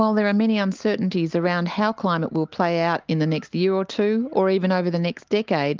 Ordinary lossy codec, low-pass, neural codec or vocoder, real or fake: Opus, 32 kbps; 7.2 kHz; none; real